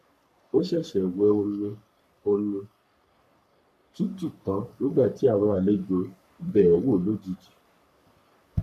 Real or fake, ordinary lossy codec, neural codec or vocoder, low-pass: fake; none; codec, 44.1 kHz, 3.4 kbps, Pupu-Codec; 14.4 kHz